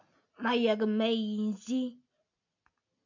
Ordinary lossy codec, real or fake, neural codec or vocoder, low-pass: AAC, 48 kbps; real; none; 7.2 kHz